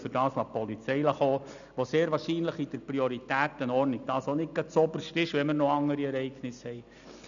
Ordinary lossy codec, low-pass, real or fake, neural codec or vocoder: none; 7.2 kHz; real; none